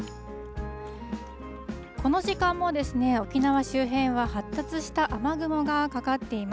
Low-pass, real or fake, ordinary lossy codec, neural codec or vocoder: none; real; none; none